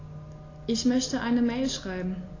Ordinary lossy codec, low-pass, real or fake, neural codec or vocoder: AAC, 32 kbps; 7.2 kHz; real; none